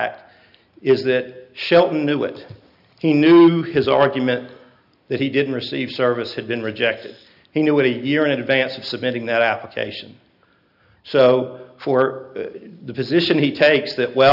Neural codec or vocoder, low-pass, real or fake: none; 5.4 kHz; real